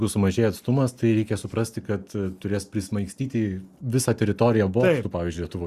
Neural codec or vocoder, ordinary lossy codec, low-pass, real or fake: none; Opus, 64 kbps; 14.4 kHz; real